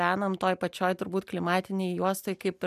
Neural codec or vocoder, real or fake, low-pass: none; real; 14.4 kHz